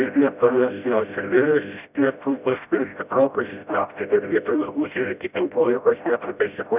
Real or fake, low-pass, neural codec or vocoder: fake; 3.6 kHz; codec, 16 kHz, 0.5 kbps, FreqCodec, smaller model